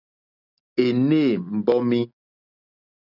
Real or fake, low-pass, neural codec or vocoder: real; 5.4 kHz; none